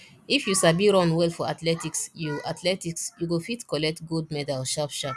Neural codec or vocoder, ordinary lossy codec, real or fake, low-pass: none; none; real; none